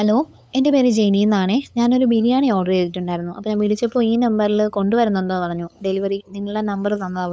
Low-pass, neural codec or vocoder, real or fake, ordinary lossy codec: none; codec, 16 kHz, 8 kbps, FunCodec, trained on LibriTTS, 25 frames a second; fake; none